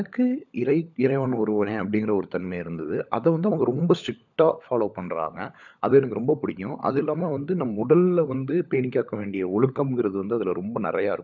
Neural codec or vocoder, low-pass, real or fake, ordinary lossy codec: codec, 16 kHz, 16 kbps, FunCodec, trained on LibriTTS, 50 frames a second; 7.2 kHz; fake; none